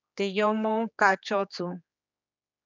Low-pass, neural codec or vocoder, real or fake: 7.2 kHz; codec, 16 kHz, 4 kbps, X-Codec, HuBERT features, trained on general audio; fake